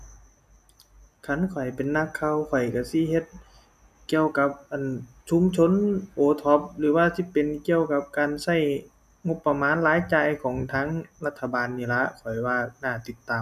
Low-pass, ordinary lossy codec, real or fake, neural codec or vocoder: 14.4 kHz; none; real; none